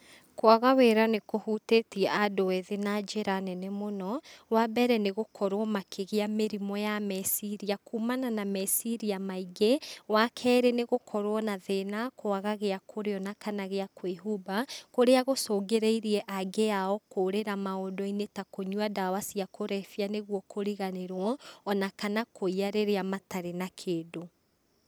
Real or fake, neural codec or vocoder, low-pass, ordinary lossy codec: real; none; none; none